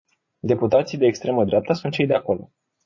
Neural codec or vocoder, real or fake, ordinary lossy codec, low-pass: vocoder, 44.1 kHz, 80 mel bands, Vocos; fake; MP3, 32 kbps; 7.2 kHz